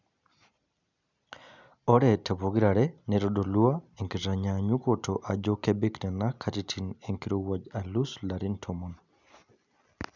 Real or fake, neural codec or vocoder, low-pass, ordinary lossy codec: real; none; 7.2 kHz; none